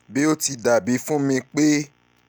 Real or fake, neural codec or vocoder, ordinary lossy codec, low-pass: real; none; none; none